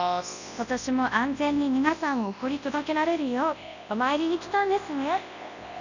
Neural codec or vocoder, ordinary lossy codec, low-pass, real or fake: codec, 24 kHz, 0.9 kbps, WavTokenizer, large speech release; none; 7.2 kHz; fake